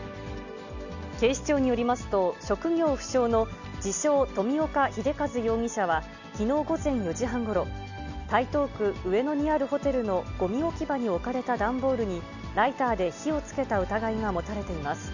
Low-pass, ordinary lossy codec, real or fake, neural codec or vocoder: 7.2 kHz; none; real; none